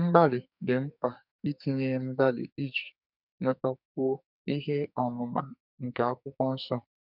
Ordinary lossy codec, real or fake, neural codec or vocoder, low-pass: none; fake; codec, 44.1 kHz, 2.6 kbps, SNAC; 5.4 kHz